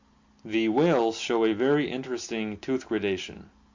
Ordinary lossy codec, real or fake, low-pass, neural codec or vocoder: MP3, 48 kbps; real; 7.2 kHz; none